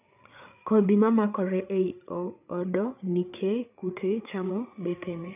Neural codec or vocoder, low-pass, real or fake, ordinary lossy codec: codec, 16 kHz, 8 kbps, FreqCodec, larger model; 3.6 kHz; fake; none